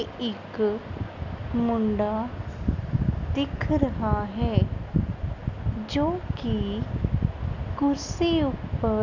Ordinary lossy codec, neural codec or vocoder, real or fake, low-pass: none; none; real; 7.2 kHz